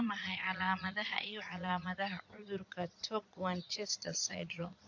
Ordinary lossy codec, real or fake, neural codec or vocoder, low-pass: AAC, 48 kbps; fake; vocoder, 22.05 kHz, 80 mel bands, Vocos; 7.2 kHz